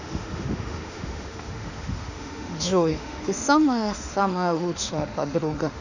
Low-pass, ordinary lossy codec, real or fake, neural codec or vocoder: 7.2 kHz; none; fake; autoencoder, 48 kHz, 32 numbers a frame, DAC-VAE, trained on Japanese speech